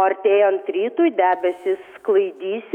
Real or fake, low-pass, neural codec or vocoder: fake; 19.8 kHz; autoencoder, 48 kHz, 128 numbers a frame, DAC-VAE, trained on Japanese speech